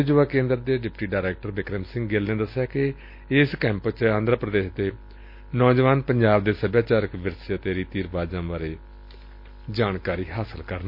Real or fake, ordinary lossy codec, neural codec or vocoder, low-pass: real; MP3, 32 kbps; none; 5.4 kHz